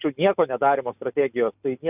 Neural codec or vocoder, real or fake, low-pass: none; real; 3.6 kHz